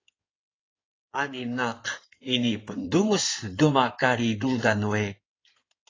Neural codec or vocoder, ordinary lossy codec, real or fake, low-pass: codec, 16 kHz in and 24 kHz out, 2.2 kbps, FireRedTTS-2 codec; AAC, 32 kbps; fake; 7.2 kHz